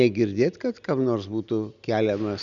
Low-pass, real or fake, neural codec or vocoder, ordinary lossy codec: 7.2 kHz; real; none; Opus, 64 kbps